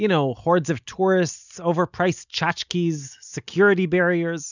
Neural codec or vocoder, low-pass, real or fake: none; 7.2 kHz; real